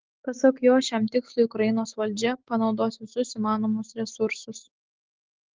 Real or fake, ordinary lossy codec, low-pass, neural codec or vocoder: fake; Opus, 16 kbps; 7.2 kHz; autoencoder, 48 kHz, 128 numbers a frame, DAC-VAE, trained on Japanese speech